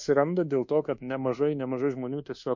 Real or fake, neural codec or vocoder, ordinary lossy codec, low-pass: fake; codec, 24 kHz, 1.2 kbps, DualCodec; MP3, 48 kbps; 7.2 kHz